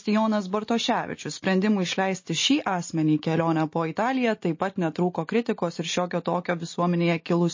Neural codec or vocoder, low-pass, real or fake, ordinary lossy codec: none; 7.2 kHz; real; MP3, 32 kbps